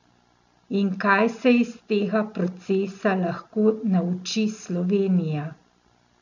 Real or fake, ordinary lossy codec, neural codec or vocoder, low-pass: real; none; none; 7.2 kHz